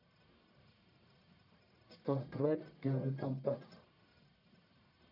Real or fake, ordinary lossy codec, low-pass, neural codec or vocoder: fake; none; 5.4 kHz; codec, 44.1 kHz, 1.7 kbps, Pupu-Codec